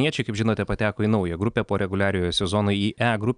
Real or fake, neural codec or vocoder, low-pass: real; none; 9.9 kHz